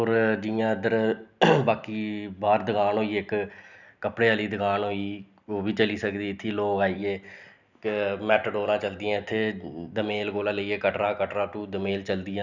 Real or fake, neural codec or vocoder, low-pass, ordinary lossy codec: real; none; 7.2 kHz; none